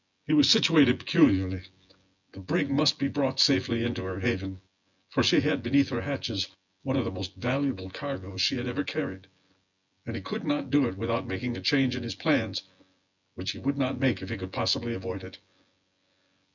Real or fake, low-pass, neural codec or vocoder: fake; 7.2 kHz; vocoder, 24 kHz, 100 mel bands, Vocos